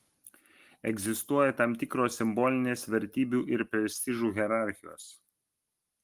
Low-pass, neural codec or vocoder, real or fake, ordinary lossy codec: 14.4 kHz; none; real; Opus, 24 kbps